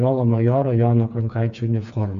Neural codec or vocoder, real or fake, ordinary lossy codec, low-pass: codec, 16 kHz, 4 kbps, FreqCodec, smaller model; fake; MP3, 64 kbps; 7.2 kHz